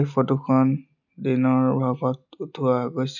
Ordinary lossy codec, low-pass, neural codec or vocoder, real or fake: none; 7.2 kHz; none; real